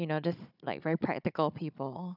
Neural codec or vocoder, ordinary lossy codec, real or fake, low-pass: codec, 16 kHz, 16 kbps, FunCodec, trained on Chinese and English, 50 frames a second; none; fake; 5.4 kHz